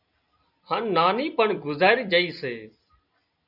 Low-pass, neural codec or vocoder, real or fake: 5.4 kHz; none; real